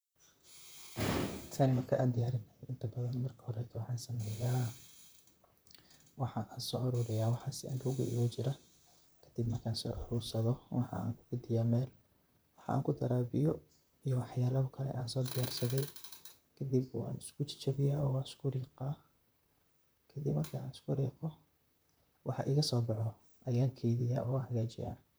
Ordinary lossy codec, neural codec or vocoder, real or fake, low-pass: none; vocoder, 44.1 kHz, 128 mel bands, Pupu-Vocoder; fake; none